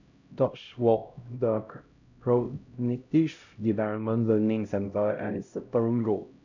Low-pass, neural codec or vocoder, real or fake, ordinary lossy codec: 7.2 kHz; codec, 16 kHz, 0.5 kbps, X-Codec, HuBERT features, trained on LibriSpeech; fake; none